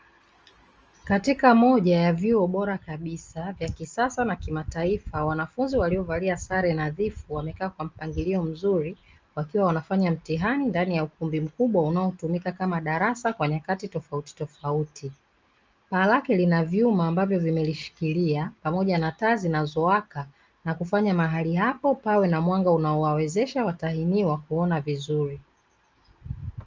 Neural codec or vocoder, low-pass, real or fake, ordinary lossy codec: none; 7.2 kHz; real; Opus, 24 kbps